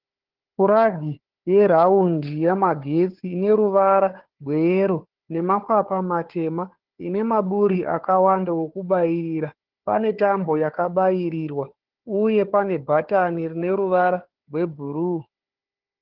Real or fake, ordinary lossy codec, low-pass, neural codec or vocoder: fake; Opus, 16 kbps; 5.4 kHz; codec, 16 kHz, 4 kbps, FunCodec, trained on Chinese and English, 50 frames a second